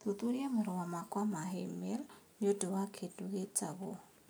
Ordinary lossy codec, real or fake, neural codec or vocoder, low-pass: none; real; none; none